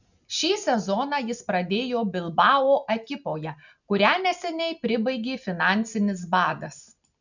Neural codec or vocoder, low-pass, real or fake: none; 7.2 kHz; real